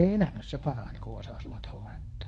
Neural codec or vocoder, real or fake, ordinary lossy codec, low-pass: codec, 24 kHz, 0.9 kbps, WavTokenizer, medium speech release version 1; fake; none; none